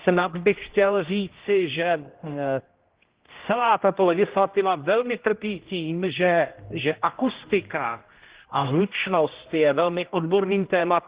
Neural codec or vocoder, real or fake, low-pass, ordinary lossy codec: codec, 16 kHz, 1 kbps, X-Codec, HuBERT features, trained on general audio; fake; 3.6 kHz; Opus, 16 kbps